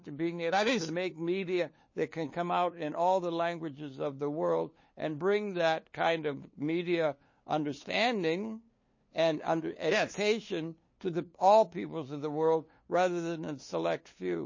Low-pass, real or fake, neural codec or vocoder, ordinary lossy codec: 7.2 kHz; fake; codec, 16 kHz, 2 kbps, FunCodec, trained on LibriTTS, 25 frames a second; MP3, 32 kbps